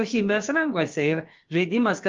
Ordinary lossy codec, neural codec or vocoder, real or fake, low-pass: Opus, 32 kbps; codec, 16 kHz, about 1 kbps, DyCAST, with the encoder's durations; fake; 7.2 kHz